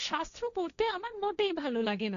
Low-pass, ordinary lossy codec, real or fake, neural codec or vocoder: 7.2 kHz; none; fake; codec, 16 kHz, 1.1 kbps, Voila-Tokenizer